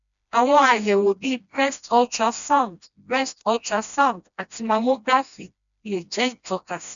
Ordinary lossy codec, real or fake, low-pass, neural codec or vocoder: none; fake; 7.2 kHz; codec, 16 kHz, 1 kbps, FreqCodec, smaller model